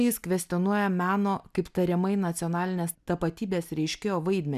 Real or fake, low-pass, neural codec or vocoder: real; 14.4 kHz; none